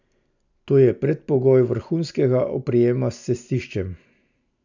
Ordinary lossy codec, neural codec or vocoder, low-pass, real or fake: none; none; 7.2 kHz; real